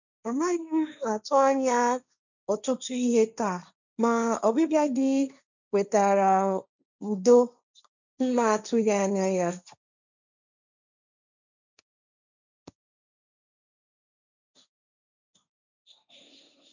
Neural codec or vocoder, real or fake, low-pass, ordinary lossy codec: codec, 16 kHz, 1.1 kbps, Voila-Tokenizer; fake; 7.2 kHz; none